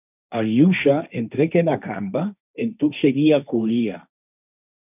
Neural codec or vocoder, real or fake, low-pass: codec, 16 kHz, 1.1 kbps, Voila-Tokenizer; fake; 3.6 kHz